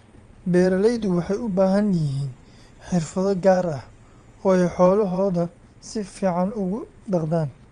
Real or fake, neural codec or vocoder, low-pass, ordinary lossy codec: fake; vocoder, 22.05 kHz, 80 mel bands, Vocos; 9.9 kHz; Opus, 32 kbps